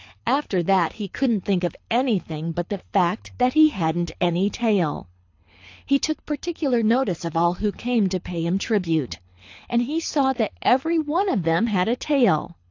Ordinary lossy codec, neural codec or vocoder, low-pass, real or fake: AAC, 48 kbps; codec, 24 kHz, 6 kbps, HILCodec; 7.2 kHz; fake